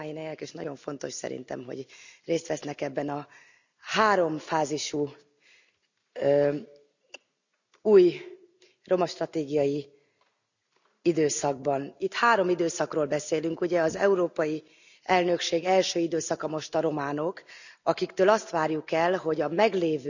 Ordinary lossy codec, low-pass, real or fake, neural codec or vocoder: none; 7.2 kHz; real; none